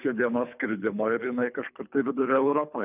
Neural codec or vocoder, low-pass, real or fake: codec, 24 kHz, 3 kbps, HILCodec; 3.6 kHz; fake